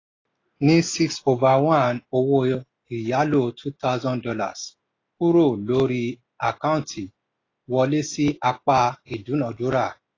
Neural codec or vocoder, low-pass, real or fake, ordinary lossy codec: none; 7.2 kHz; real; AAC, 32 kbps